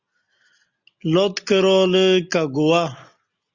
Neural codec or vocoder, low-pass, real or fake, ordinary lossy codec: none; 7.2 kHz; real; Opus, 64 kbps